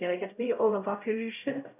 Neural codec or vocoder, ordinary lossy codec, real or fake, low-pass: codec, 16 kHz, 0.5 kbps, X-Codec, HuBERT features, trained on LibriSpeech; none; fake; 3.6 kHz